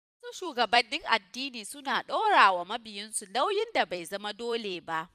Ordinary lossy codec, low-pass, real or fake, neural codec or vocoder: none; 14.4 kHz; fake; vocoder, 44.1 kHz, 128 mel bands every 256 samples, BigVGAN v2